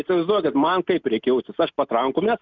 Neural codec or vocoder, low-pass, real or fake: none; 7.2 kHz; real